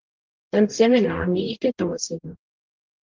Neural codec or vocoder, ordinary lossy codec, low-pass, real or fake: codec, 44.1 kHz, 0.9 kbps, DAC; Opus, 32 kbps; 7.2 kHz; fake